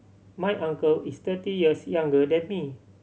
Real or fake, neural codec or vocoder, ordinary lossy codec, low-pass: real; none; none; none